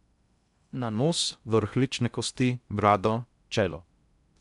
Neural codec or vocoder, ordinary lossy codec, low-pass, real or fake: codec, 16 kHz in and 24 kHz out, 0.6 kbps, FocalCodec, streaming, 2048 codes; none; 10.8 kHz; fake